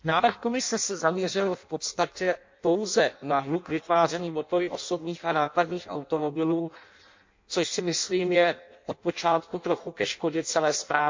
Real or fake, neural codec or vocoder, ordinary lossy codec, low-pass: fake; codec, 16 kHz in and 24 kHz out, 0.6 kbps, FireRedTTS-2 codec; MP3, 48 kbps; 7.2 kHz